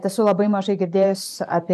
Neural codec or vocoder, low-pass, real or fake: vocoder, 44.1 kHz, 128 mel bands every 512 samples, BigVGAN v2; 14.4 kHz; fake